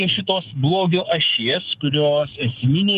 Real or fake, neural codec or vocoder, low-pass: fake; codec, 44.1 kHz, 7.8 kbps, DAC; 14.4 kHz